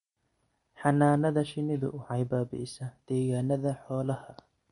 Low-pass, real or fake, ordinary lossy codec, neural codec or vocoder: 10.8 kHz; real; MP3, 48 kbps; none